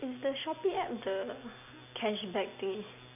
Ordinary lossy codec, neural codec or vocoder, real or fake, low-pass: none; none; real; 3.6 kHz